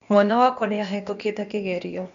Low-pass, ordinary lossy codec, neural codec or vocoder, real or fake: 7.2 kHz; none; codec, 16 kHz, 0.8 kbps, ZipCodec; fake